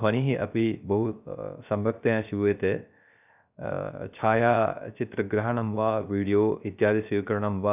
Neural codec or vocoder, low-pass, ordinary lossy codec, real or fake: codec, 16 kHz, 0.3 kbps, FocalCodec; 3.6 kHz; none; fake